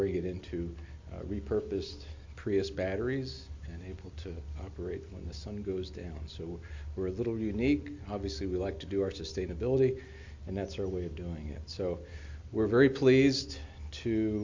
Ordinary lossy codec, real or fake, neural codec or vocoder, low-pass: MP3, 48 kbps; real; none; 7.2 kHz